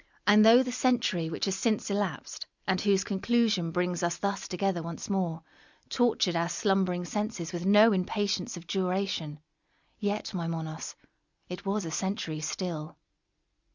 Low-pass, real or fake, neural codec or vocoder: 7.2 kHz; real; none